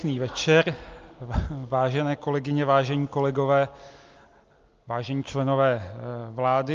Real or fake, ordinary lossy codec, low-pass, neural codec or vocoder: real; Opus, 32 kbps; 7.2 kHz; none